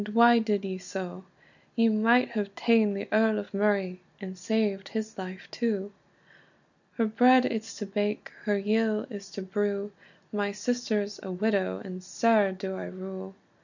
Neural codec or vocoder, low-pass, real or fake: none; 7.2 kHz; real